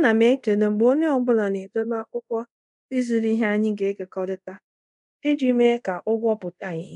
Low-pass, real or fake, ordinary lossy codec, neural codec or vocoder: 10.8 kHz; fake; none; codec, 24 kHz, 0.5 kbps, DualCodec